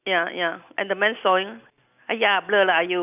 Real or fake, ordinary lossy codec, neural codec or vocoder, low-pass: real; none; none; 3.6 kHz